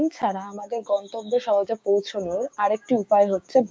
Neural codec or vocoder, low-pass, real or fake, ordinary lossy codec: codec, 16 kHz, 6 kbps, DAC; none; fake; none